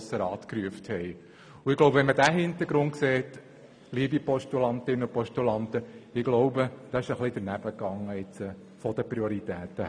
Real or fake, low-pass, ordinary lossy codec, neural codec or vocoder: real; 9.9 kHz; none; none